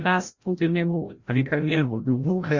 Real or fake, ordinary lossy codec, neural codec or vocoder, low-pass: fake; Opus, 64 kbps; codec, 16 kHz, 0.5 kbps, FreqCodec, larger model; 7.2 kHz